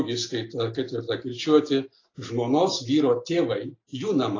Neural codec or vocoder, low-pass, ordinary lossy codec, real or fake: none; 7.2 kHz; AAC, 32 kbps; real